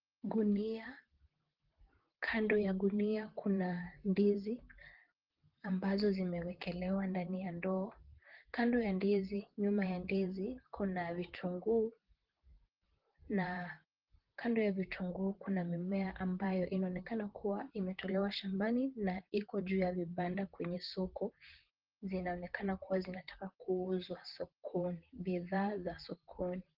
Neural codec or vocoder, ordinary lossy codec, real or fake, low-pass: vocoder, 44.1 kHz, 128 mel bands, Pupu-Vocoder; Opus, 16 kbps; fake; 5.4 kHz